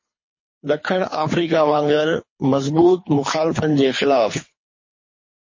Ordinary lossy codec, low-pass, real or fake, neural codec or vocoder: MP3, 32 kbps; 7.2 kHz; fake; codec, 24 kHz, 3 kbps, HILCodec